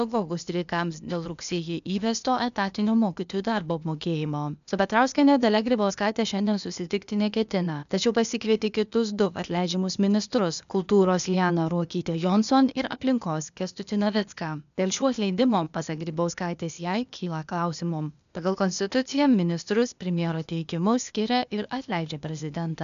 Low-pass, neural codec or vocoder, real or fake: 7.2 kHz; codec, 16 kHz, 0.8 kbps, ZipCodec; fake